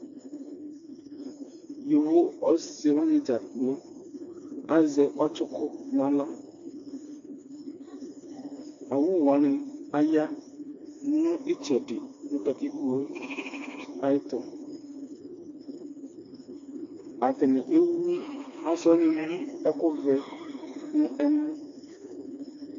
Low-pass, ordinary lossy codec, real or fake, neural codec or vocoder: 7.2 kHz; AAC, 48 kbps; fake; codec, 16 kHz, 2 kbps, FreqCodec, smaller model